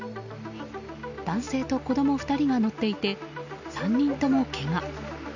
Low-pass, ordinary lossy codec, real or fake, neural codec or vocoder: 7.2 kHz; none; real; none